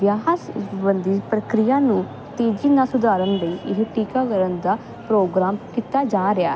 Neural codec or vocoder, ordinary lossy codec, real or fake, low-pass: none; none; real; none